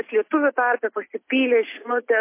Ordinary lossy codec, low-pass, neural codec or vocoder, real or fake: MP3, 24 kbps; 3.6 kHz; none; real